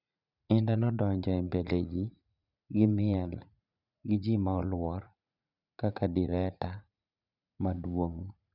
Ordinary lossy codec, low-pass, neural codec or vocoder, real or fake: none; 5.4 kHz; vocoder, 44.1 kHz, 80 mel bands, Vocos; fake